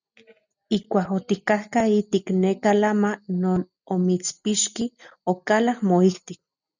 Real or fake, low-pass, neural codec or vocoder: real; 7.2 kHz; none